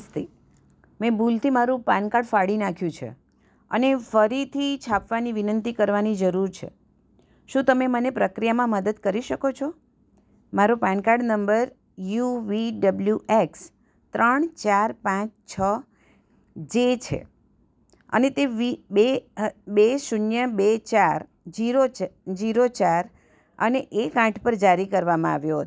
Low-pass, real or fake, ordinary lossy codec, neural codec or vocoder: none; real; none; none